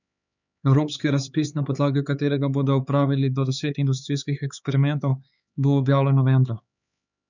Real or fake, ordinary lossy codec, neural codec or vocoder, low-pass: fake; none; codec, 16 kHz, 4 kbps, X-Codec, HuBERT features, trained on LibriSpeech; 7.2 kHz